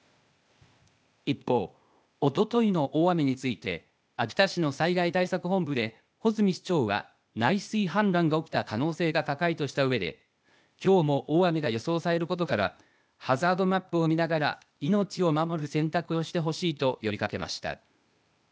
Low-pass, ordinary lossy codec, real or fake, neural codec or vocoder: none; none; fake; codec, 16 kHz, 0.8 kbps, ZipCodec